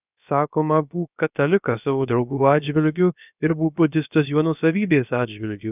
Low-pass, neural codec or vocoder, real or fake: 3.6 kHz; codec, 16 kHz, about 1 kbps, DyCAST, with the encoder's durations; fake